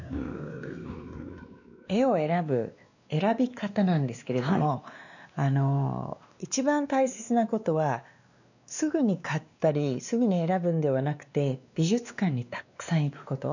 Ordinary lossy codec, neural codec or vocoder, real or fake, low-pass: none; codec, 16 kHz, 2 kbps, X-Codec, WavLM features, trained on Multilingual LibriSpeech; fake; 7.2 kHz